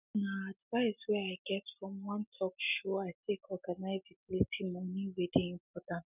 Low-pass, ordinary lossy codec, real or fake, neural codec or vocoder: 3.6 kHz; none; real; none